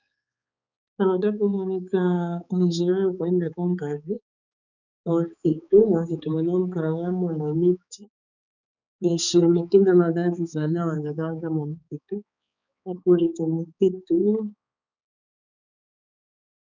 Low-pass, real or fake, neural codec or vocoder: 7.2 kHz; fake; codec, 16 kHz, 4 kbps, X-Codec, HuBERT features, trained on balanced general audio